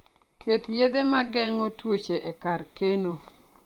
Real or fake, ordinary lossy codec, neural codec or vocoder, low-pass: fake; Opus, 32 kbps; vocoder, 44.1 kHz, 128 mel bands, Pupu-Vocoder; 19.8 kHz